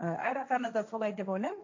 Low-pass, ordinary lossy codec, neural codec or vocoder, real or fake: 7.2 kHz; AAC, 48 kbps; codec, 16 kHz, 1.1 kbps, Voila-Tokenizer; fake